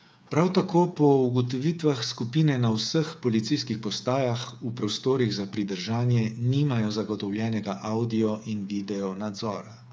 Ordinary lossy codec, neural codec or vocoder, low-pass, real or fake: none; codec, 16 kHz, 8 kbps, FreqCodec, smaller model; none; fake